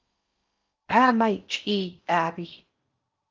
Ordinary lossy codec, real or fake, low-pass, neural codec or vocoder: Opus, 24 kbps; fake; 7.2 kHz; codec, 16 kHz in and 24 kHz out, 0.6 kbps, FocalCodec, streaming, 4096 codes